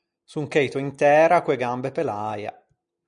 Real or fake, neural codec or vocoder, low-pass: real; none; 9.9 kHz